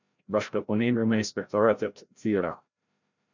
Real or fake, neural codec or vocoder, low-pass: fake; codec, 16 kHz, 0.5 kbps, FreqCodec, larger model; 7.2 kHz